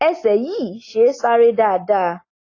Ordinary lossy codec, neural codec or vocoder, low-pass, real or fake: AAC, 32 kbps; none; 7.2 kHz; real